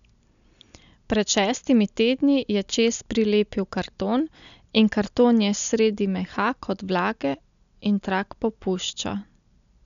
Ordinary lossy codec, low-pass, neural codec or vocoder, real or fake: none; 7.2 kHz; none; real